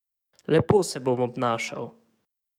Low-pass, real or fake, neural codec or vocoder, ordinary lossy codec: 19.8 kHz; fake; codec, 44.1 kHz, 7.8 kbps, DAC; none